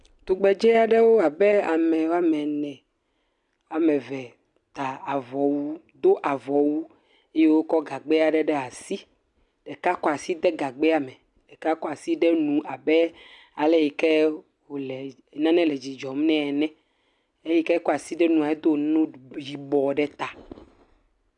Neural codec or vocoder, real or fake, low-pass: none; real; 10.8 kHz